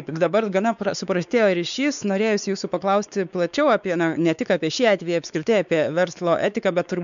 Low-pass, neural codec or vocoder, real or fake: 7.2 kHz; codec, 16 kHz, 4 kbps, X-Codec, WavLM features, trained on Multilingual LibriSpeech; fake